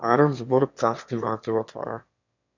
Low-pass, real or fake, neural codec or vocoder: 7.2 kHz; fake; autoencoder, 22.05 kHz, a latent of 192 numbers a frame, VITS, trained on one speaker